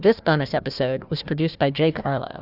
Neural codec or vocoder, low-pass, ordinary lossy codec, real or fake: codec, 16 kHz, 1 kbps, FunCodec, trained on Chinese and English, 50 frames a second; 5.4 kHz; Opus, 64 kbps; fake